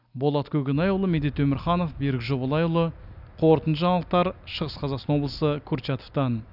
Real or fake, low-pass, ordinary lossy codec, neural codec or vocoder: real; 5.4 kHz; none; none